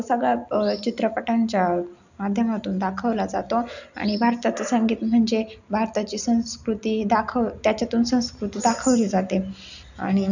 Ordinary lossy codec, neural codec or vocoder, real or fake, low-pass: none; none; real; 7.2 kHz